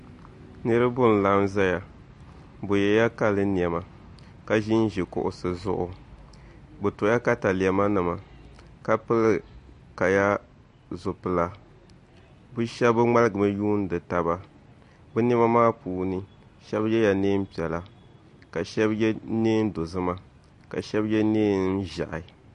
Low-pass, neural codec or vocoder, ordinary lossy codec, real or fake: 10.8 kHz; none; MP3, 48 kbps; real